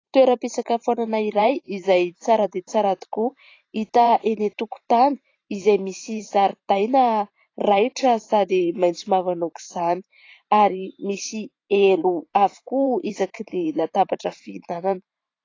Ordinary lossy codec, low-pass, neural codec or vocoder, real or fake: AAC, 32 kbps; 7.2 kHz; vocoder, 44.1 kHz, 128 mel bands every 512 samples, BigVGAN v2; fake